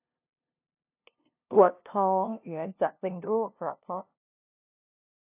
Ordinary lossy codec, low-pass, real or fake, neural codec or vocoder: AAC, 32 kbps; 3.6 kHz; fake; codec, 16 kHz, 0.5 kbps, FunCodec, trained on LibriTTS, 25 frames a second